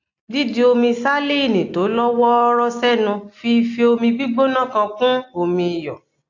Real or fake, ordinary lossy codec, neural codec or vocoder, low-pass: real; AAC, 48 kbps; none; 7.2 kHz